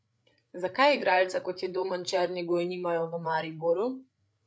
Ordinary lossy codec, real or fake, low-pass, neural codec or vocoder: none; fake; none; codec, 16 kHz, 8 kbps, FreqCodec, larger model